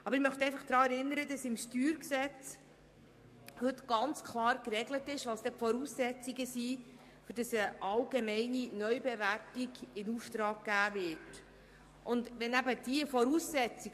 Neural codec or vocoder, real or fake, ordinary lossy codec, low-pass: codec, 44.1 kHz, 7.8 kbps, DAC; fake; MP3, 64 kbps; 14.4 kHz